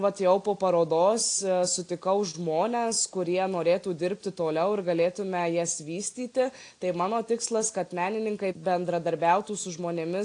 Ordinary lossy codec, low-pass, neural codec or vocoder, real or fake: AAC, 48 kbps; 9.9 kHz; none; real